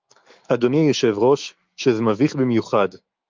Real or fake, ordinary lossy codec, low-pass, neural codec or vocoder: fake; Opus, 24 kbps; 7.2 kHz; autoencoder, 48 kHz, 128 numbers a frame, DAC-VAE, trained on Japanese speech